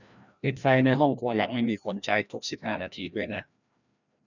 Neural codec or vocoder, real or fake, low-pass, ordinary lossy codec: codec, 16 kHz, 1 kbps, FreqCodec, larger model; fake; 7.2 kHz; none